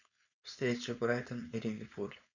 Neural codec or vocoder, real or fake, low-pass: codec, 16 kHz, 4.8 kbps, FACodec; fake; 7.2 kHz